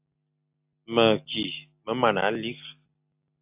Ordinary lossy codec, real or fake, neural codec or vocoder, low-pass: AAC, 32 kbps; real; none; 3.6 kHz